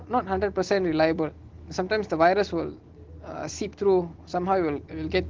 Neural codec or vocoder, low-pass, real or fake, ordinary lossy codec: none; 7.2 kHz; real; Opus, 16 kbps